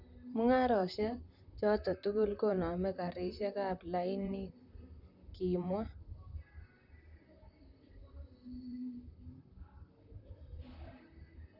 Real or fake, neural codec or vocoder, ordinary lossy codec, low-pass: fake; vocoder, 44.1 kHz, 128 mel bands, Pupu-Vocoder; none; 5.4 kHz